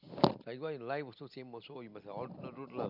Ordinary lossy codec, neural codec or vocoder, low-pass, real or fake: none; none; 5.4 kHz; real